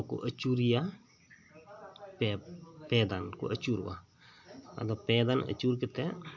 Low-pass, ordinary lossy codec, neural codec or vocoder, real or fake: 7.2 kHz; MP3, 64 kbps; none; real